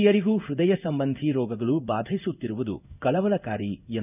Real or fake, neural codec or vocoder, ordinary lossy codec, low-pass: fake; codec, 16 kHz in and 24 kHz out, 1 kbps, XY-Tokenizer; none; 3.6 kHz